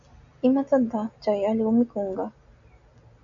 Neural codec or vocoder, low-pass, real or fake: none; 7.2 kHz; real